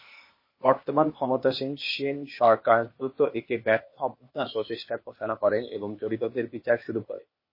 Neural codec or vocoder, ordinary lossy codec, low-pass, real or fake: codec, 16 kHz, 0.8 kbps, ZipCodec; MP3, 24 kbps; 5.4 kHz; fake